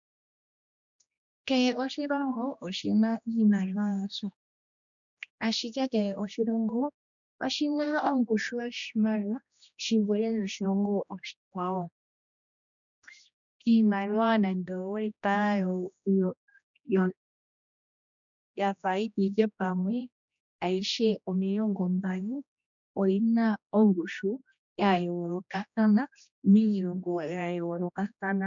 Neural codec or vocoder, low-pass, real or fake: codec, 16 kHz, 1 kbps, X-Codec, HuBERT features, trained on general audio; 7.2 kHz; fake